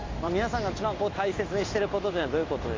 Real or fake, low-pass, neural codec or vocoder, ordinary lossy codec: fake; 7.2 kHz; codec, 16 kHz in and 24 kHz out, 1 kbps, XY-Tokenizer; AAC, 32 kbps